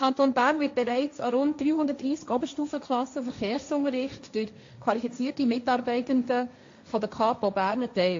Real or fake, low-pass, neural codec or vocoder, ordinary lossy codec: fake; 7.2 kHz; codec, 16 kHz, 1.1 kbps, Voila-Tokenizer; AAC, 48 kbps